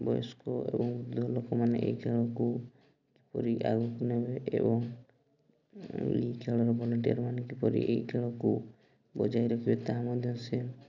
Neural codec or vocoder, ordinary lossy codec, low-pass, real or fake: none; none; 7.2 kHz; real